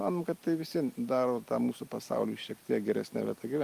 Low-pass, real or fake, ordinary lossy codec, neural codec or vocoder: 14.4 kHz; real; Opus, 32 kbps; none